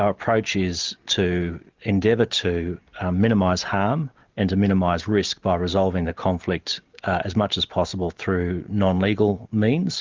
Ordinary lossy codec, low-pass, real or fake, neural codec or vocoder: Opus, 32 kbps; 7.2 kHz; real; none